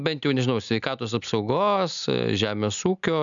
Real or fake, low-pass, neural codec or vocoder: real; 7.2 kHz; none